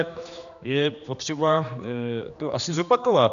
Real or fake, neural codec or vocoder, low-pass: fake; codec, 16 kHz, 2 kbps, X-Codec, HuBERT features, trained on general audio; 7.2 kHz